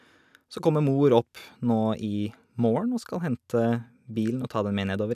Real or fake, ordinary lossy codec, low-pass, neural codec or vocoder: real; none; 14.4 kHz; none